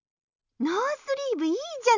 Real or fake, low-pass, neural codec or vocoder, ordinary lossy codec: real; 7.2 kHz; none; none